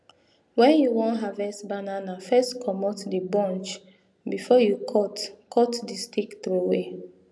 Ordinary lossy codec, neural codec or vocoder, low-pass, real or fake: none; none; none; real